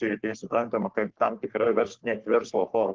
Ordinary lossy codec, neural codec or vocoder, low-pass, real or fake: Opus, 16 kbps; codec, 44.1 kHz, 3.4 kbps, Pupu-Codec; 7.2 kHz; fake